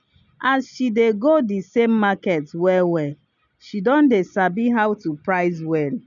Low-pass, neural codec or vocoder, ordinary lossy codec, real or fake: 7.2 kHz; none; none; real